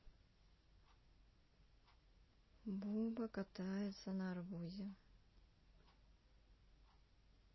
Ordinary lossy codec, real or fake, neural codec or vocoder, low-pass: MP3, 24 kbps; real; none; 7.2 kHz